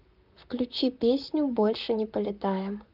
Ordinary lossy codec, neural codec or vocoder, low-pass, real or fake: Opus, 24 kbps; vocoder, 44.1 kHz, 128 mel bands, Pupu-Vocoder; 5.4 kHz; fake